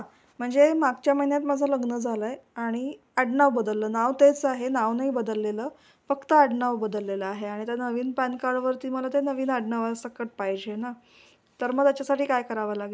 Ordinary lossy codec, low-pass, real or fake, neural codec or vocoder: none; none; real; none